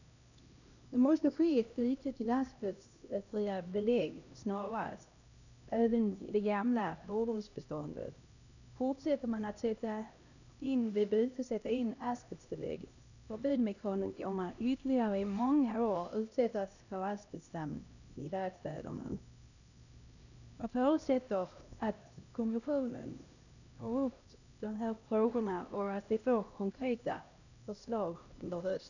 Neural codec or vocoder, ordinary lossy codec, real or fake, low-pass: codec, 16 kHz, 1 kbps, X-Codec, HuBERT features, trained on LibriSpeech; none; fake; 7.2 kHz